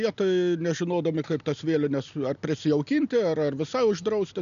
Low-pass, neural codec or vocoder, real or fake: 7.2 kHz; none; real